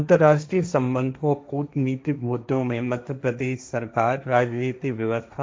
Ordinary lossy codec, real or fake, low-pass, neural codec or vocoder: none; fake; none; codec, 16 kHz, 1.1 kbps, Voila-Tokenizer